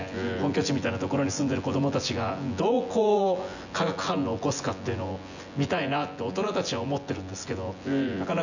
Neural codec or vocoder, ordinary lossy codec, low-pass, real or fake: vocoder, 24 kHz, 100 mel bands, Vocos; none; 7.2 kHz; fake